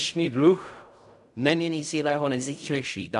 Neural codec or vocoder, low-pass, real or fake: codec, 16 kHz in and 24 kHz out, 0.4 kbps, LongCat-Audio-Codec, fine tuned four codebook decoder; 10.8 kHz; fake